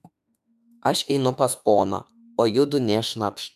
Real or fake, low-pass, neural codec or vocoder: fake; 14.4 kHz; autoencoder, 48 kHz, 32 numbers a frame, DAC-VAE, trained on Japanese speech